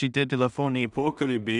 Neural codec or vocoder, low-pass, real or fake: codec, 16 kHz in and 24 kHz out, 0.4 kbps, LongCat-Audio-Codec, two codebook decoder; 10.8 kHz; fake